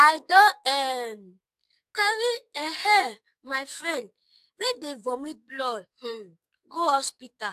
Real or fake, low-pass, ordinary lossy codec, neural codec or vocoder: fake; 14.4 kHz; AAC, 96 kbps; codec, 44.1 kHz, 2.6 kbps, SNAC